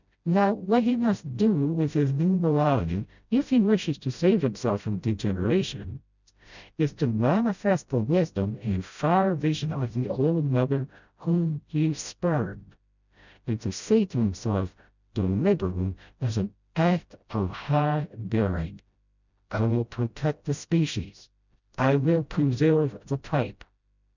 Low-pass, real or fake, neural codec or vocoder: 7.2 kHz; fake; codec, 16 kHz, 0.5 kbps, FreqCodec, smaller model